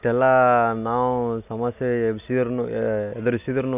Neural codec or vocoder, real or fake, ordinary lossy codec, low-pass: none; real; none; 3.6 kHz